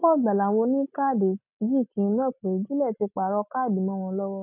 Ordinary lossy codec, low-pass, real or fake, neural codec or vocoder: none; 3.6 kHz; real; none